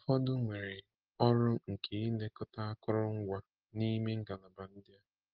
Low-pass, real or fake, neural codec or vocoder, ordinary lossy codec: 5.4 kHz; real; none; Opus, 16 kbps